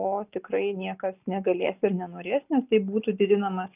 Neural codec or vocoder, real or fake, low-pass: none; real; 3.6 kHz